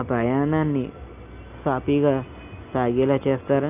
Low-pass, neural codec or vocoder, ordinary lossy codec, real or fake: 3.6 kHz; none; none; real